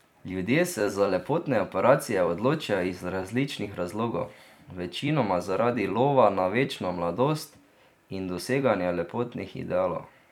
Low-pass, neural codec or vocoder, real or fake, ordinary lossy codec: 19.8 kHz; vocoder, 44.1 kHz, 128 mel bands every 256 samples, BigVGAN v2; fake; none